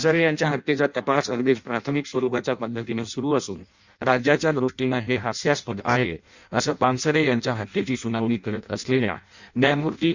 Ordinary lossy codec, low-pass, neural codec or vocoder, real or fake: Opus, 64 kbps; 7.2 kHz; codec, 16 kHz in and 24 kHz out, 0.6 kbps, FireRedTTS-2 codec; fake